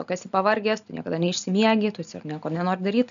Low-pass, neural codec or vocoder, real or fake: 7.2 kHz; none; real